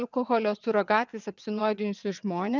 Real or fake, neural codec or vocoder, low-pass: fake; vocoder, 22.05 kHz, 80 mel bands, WaveNeXt; 7.2 kHz